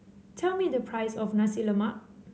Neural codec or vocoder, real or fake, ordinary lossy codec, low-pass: none; real; none; none